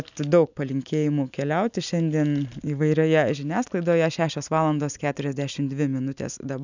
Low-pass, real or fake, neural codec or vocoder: 7.2 kHz; real; none